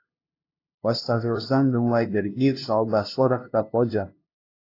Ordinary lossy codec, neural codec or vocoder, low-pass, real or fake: AAC, 32 kbps; codec, 16 kHz, 0.5 kbps, FunCodec, trained on LibriTTS, 25 frames a second; 5.4 kHz; fake